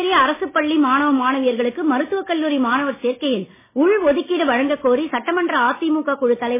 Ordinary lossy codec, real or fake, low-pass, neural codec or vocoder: MP3, 16 kbps; real; 3.6 kHz; none